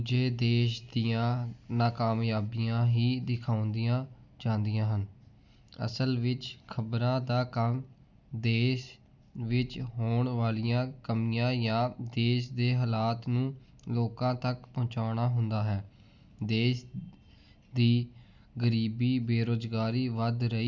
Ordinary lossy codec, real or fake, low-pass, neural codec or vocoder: none; real; 7.2 kHz; none